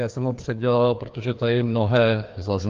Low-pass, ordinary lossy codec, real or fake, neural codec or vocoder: 7.2 kHz; Opus, 24 kbps; fake; codec, 16 kHz, 2 kbps, FreqCodec, larger model